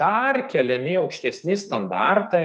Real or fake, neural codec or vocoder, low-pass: fake; codec, 44.1 kHz, 2.6 kbps, SNAC; 10.8 kHz